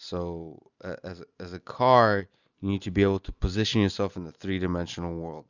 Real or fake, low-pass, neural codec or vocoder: real; 7.2 kHz; none